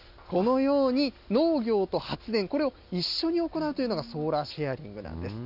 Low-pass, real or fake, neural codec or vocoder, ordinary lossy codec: 5.4 kHz; real; none; none